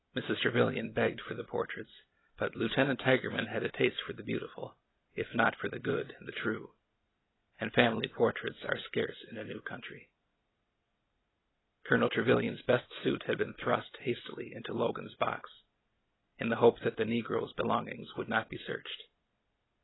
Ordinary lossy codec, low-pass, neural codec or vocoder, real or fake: AAC, 16 kbps; 7.2 kHz; none; real